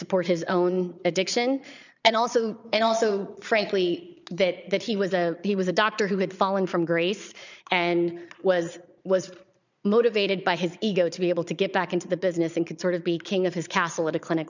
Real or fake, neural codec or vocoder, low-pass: fake; vocoder, 22.05 kHz, 80 mel bands, Vocos; 7.2 kHz